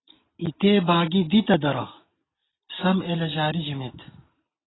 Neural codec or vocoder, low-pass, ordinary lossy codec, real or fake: none; 7.2 kHz; AAC, 16 kbps; real